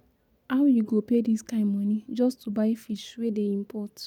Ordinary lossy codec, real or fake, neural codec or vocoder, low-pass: none; real; none; 19.8 kHz